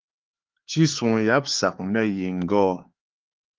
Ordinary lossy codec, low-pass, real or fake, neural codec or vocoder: Opus, 16 kbps; 7.2 kHz; fake; codec, 16 kHz, 4 kbps, X-Codec, HuBERT features, trained on LibriSpeech